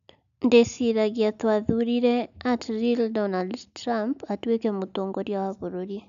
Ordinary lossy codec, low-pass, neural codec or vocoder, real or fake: none; 7.2 kHz; none; real